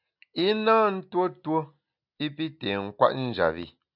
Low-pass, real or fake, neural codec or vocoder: 5.4 kHz; real; none